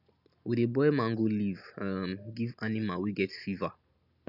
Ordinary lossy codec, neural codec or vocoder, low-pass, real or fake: none; none; 5.4 kHz; real